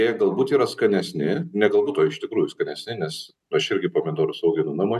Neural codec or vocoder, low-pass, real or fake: none; 14.4 kHz; real